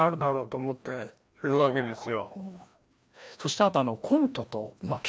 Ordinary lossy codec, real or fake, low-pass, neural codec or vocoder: none; fake; none; codec, 16 kHz, 1 kbps, FreqCodec, larger model